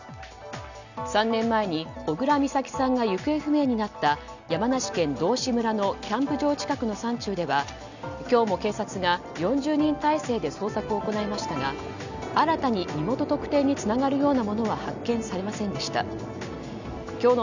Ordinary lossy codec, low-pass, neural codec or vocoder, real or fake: none; 7.2 kHz; none; real